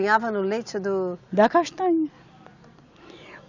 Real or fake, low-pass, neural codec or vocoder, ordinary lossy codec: real; 7.2 kHz; none; none